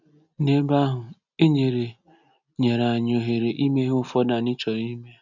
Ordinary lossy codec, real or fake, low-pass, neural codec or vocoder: none; real; 7.2 kHz; none